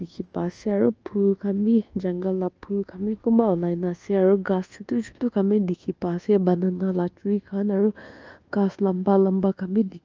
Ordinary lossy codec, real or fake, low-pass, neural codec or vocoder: Opus, 24 kbps; fake; 7.2 kHz; codec, 24 kHz, 1.2 kbps, DualCodec